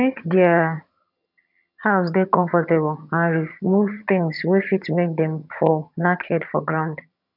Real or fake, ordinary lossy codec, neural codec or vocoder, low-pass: fake; none; vocoder, 22.05 kHz, 80 mel bands, HiFi-GAN; 5.4 kHz